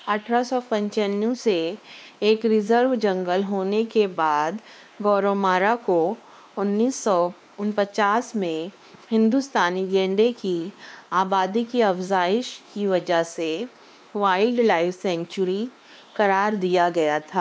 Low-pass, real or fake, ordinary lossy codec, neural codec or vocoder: none; fake; none; codec, 16 kHz, 2 kbps, X-Codec, WavLM features, trained on Multilingual LibriSpeech